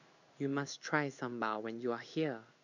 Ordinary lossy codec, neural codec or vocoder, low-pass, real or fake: none; codec, 16 kHz in and 24 kHz out, 1 kbps, XY-Tokenizer; 7.2 kHz; fake